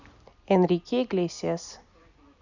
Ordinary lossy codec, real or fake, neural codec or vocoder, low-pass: none; real; none; 7.2 kHz